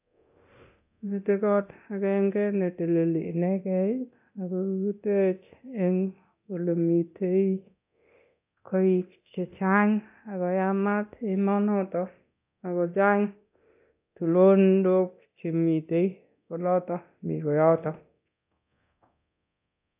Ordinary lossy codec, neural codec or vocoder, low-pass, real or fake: AAC, 32 kbps; codec, 24 kHz, 0.9 kbps, DualCodec; 3.6 kHz; fake